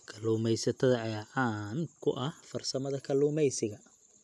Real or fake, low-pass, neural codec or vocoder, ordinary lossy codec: real; none; none; none